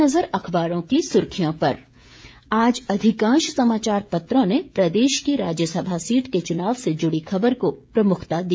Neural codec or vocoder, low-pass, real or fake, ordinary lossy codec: codec, 16 kHz, 16 kbps, FreqCodec, smaller model; none; fake; none